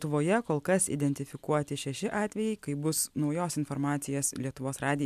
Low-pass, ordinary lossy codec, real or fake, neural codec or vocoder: 14.4 kHz; MP3, 96 kbps; real; none